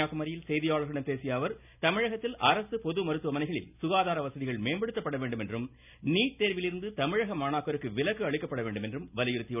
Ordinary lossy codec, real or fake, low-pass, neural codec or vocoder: none; real; 3.6 kHz; none